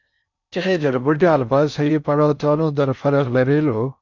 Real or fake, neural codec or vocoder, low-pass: fake; codec, 16 kHz in and 24 kHz out, 0.6 kbps, FocalCodec, streaming, 4096 codes; 7.2 kHz